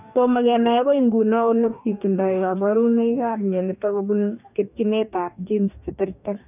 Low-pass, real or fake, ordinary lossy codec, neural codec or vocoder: 3.6 kHz; fake; none; codec, 44.1 kHz, 2.6 kbps, DAC